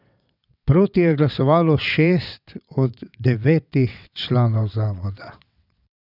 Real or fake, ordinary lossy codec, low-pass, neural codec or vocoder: fake; none; 5.4 kHz; vocoder, 22.05 kHz, 80 mel bands, WaveNeXt